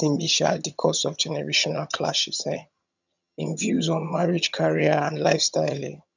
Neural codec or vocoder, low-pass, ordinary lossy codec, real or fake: vocoder, 22.05 kHz, 80 mel bands, HiFi-GAN; 7.2 kHz; none; fake